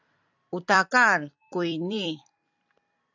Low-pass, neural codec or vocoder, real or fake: 7.2 kHz; vocoder, 44.1 kHz, 128 mel bands every 256 samples, BigVGAN v2; fake